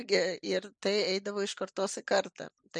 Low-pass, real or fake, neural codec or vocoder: 9.9 kHz; real; none